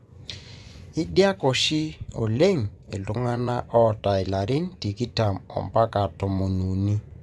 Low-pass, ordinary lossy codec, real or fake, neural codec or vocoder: none; none; fake; vocoder, 24 kHz, 100 mel bands, Vocos